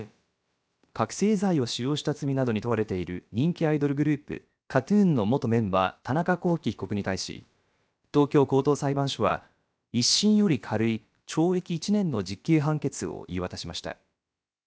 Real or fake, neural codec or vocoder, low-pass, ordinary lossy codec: fake; codec, 16 kHz, about 1 kbps, DyCAST, with the encoder's durations; none; none